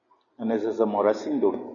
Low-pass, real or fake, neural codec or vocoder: 7.2 kHz; real; none